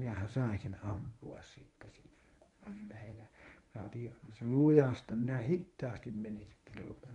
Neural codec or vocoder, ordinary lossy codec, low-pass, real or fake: codec, 24 kHz, 0.9 kbps, WavTokenizer, medium speech release version 1; none; 10.8 kHz; fake